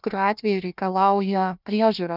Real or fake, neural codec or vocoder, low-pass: fake; codec, 16 kHz, 1 kbps, FunCodec, trained on Chinese and English, 50 frames a second; 5.4 kHz